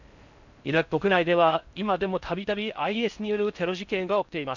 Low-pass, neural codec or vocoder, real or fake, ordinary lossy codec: 7.2 kHz; codec, 16 kHz in and 24 kHz out, 0.6 kbps, FocalCodec, streaming, 4096 codes; fake; none